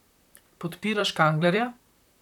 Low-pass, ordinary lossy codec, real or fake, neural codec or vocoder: 19.8 kHz; none; fake; vocoder, 44.1 kHz, 128 mel bands, Pupu-Vocoder